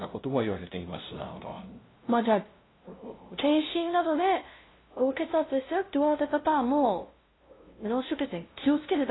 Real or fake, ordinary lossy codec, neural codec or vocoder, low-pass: fake; AAC, 16 kbps; codec, 16 kHz, 0.5 kbps, FunCodec, trained on LibriTTS, 25 frames a second; 7.2 kHz